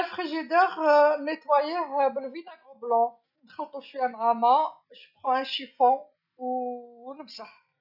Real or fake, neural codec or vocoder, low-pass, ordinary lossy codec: real; none; 5.4 kHz; none